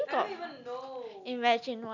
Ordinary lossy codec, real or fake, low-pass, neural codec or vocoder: none; real; 7.2 kHz; none